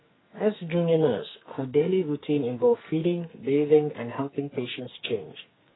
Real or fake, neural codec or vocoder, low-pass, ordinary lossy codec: fake; codec, 44.1 kHz, 2.6 kbps, SNAC; 7.2 kHz; AAC, 16 kbps